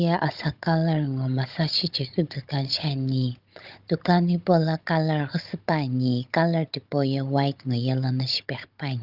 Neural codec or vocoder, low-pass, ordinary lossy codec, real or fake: codec, 16 kHz, 16 kbps, FunCodec, trained on Chinese and English, 50 frames a second; 5.4 kHz; Opus, 32 kbps; fake